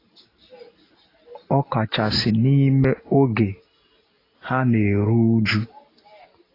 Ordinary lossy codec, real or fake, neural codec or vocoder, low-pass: AAC, 24 kbps; real; none; 5.4 kHz